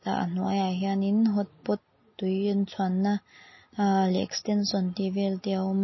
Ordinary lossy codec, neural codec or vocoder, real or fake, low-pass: MP3, 24 kbps; none; real; 7.2 kHz